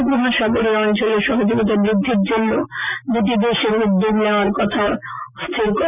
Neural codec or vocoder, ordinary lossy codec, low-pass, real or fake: none; none; 3.6 kHz; real